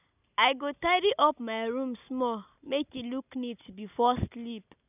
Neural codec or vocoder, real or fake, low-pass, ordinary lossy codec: none; real; 3.6 kHz; none